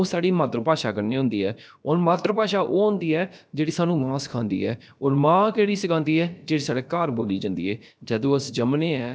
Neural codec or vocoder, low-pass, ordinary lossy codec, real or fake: codec, 16 kHz, about 1 kbps, DyCAST, with the encoder's durations; none; none; fake